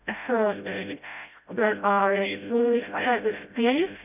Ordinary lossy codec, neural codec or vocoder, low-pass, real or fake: none; codec, 16 kHz, 0.5 kbps, FreqCodec, smaller model; 3.6 kHz; fake